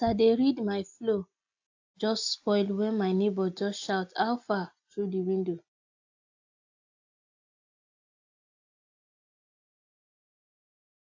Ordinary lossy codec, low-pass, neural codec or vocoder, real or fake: AAC, 48 kbps; 7.2 kHz; none; real